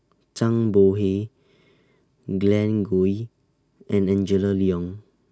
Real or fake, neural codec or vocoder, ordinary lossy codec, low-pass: real; none; none; none